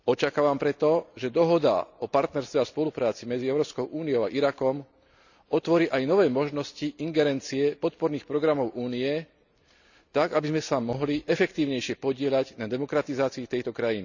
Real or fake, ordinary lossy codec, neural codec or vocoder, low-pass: real; none; none; 7.2 kHz